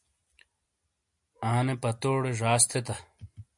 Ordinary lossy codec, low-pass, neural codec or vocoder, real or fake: MP3, 64 kbps; 10.8 kHz; none; real